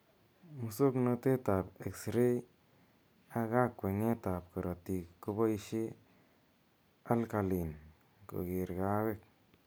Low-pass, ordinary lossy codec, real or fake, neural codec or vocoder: none; none; real; none